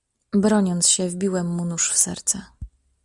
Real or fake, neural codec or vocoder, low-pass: real; none; 10.8 kHz